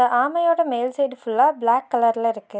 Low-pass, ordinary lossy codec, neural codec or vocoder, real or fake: none; none; none; real